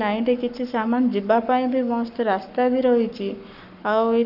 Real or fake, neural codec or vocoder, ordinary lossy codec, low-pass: fake; codec, 44.1 kHz, 7.8 kbps, Pupu-Codec; none; 5.4 kHz